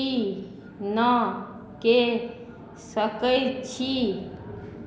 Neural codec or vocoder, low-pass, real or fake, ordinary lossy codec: none; none; real; none